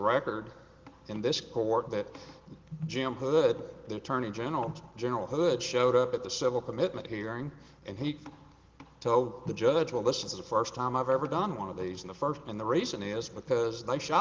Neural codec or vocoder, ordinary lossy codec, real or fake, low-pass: none; Opus, 16 kbps; real; 7.2 kHz